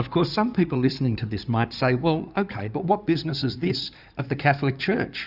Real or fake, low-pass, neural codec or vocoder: fake; 5.4 kHz; codec, 16 kHz in and 24 kHz out, 2.2 kbps, FireRedTTS-2 codec